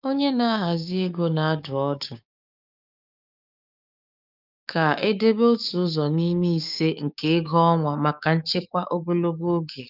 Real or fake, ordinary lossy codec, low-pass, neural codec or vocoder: fake; none; 5.4 kHz; codec, 16 kHz, 6 kbps, DAC